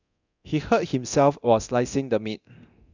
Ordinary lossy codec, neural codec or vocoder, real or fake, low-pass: none; codec, 24 kHz, 0.9 kbps, DualCodec; fake; 7.2 kHz